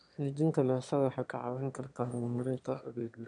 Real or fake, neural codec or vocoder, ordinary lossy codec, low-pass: fake; autoencoder, 22.05 kHz, a latent of 192 numbers a frame, VITS, trained on one speaker; none; 9.9 kHz